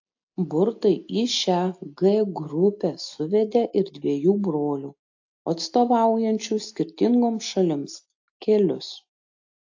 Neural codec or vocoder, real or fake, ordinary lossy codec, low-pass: none; real; AAC, 48 kbps; 7.2 kHz